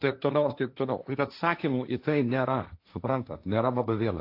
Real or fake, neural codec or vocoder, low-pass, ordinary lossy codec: fake; codec, 16 kHz, 1.1 kbps, Voila-Tokenizer; 5.4 kHz; AAC, 32 kbps